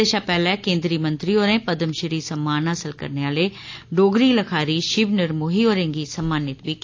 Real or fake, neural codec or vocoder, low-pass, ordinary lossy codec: real; none; 7.2 kHz; AAC, 48 kbps